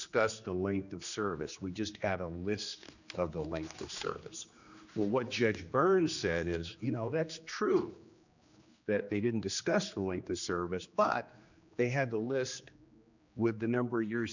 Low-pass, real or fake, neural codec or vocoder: 7.2 kHz; fake; codec, 16 kHz, 2 kbps, X-Codec, HuBERT features, trained on general audio